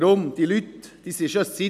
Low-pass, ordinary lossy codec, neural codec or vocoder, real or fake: 14.4 kHz; none; none; real